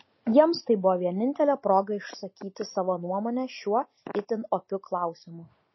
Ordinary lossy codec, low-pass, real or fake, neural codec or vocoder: MP3, 24 kbps; 7.2 kHz; real; none